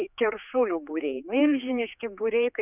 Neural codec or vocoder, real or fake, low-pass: codec, 16 kHz, 4 kbps, X-Codec, HuBERT features, trained on general audio; fake; 3.6 kHz